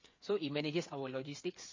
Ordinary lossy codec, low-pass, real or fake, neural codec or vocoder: MP3, 32 kbps; 7.2 kHz; fake; codec, 16 kHz, 8 kbps, FreqCodec, smaller model